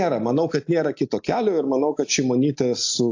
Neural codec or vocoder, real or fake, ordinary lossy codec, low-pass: none; real; AAC, 48 kbps; 7.2 kHz